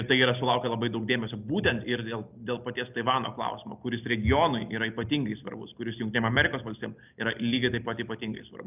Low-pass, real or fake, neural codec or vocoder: 3.6 kHz; real; none